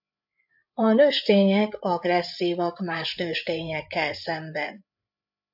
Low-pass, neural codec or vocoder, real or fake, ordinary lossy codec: 5.4 kHz; codec, 16 kHz, 8 kbps, FreqCodec, larger model; fake; AAC, 48 kbps